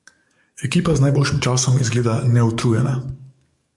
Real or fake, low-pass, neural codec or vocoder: fake; 10.8 kHz; codec, 44.1 kHz, 7.8 kbps, DAC